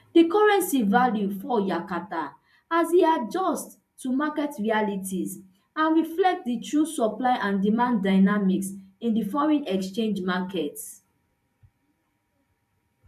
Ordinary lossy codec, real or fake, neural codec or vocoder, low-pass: none; fake; vocoder, 44.1 kHz, 128 mel bands every 512 samples, BigVGAN v2; 14.4 kHz